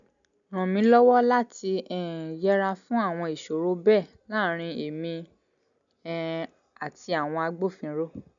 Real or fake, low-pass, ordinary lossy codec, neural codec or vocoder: real; 7.2 kHz; none; none